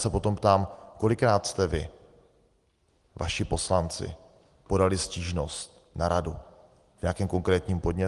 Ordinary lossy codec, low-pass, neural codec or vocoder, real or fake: Opus, 24 kbps; 10.8 kHz; none; real